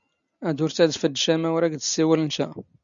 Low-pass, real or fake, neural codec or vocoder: 7.2 kHz; real; none